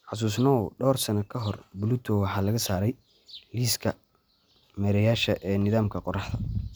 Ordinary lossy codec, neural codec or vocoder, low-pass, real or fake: none; none; none; real